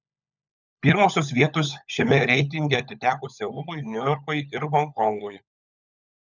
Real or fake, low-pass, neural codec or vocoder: fake; 7.2 kHz; codec, 16 kHz, 16 kbps, FunCodec, trained on LibriTTS, 50 frames a second